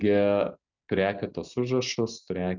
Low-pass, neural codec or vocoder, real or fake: 7.2 kHz; none; real